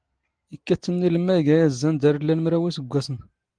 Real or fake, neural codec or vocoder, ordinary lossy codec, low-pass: real; none; Opus, 24 kbps; 9.9 kHz